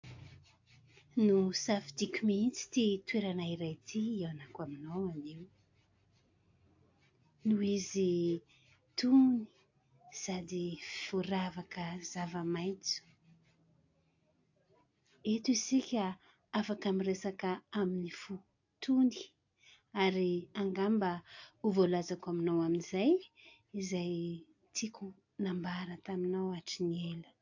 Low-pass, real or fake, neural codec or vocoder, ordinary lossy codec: 7.2 kHz; real; none; AAC, 48 kbps